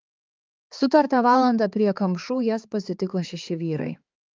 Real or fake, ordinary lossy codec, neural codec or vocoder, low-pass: fake; Opus, 24 kbps; codec, 16 kHz, 4 kbps, X-Codec, HuBERT features, trained on balanced general audio; 7.2 kHz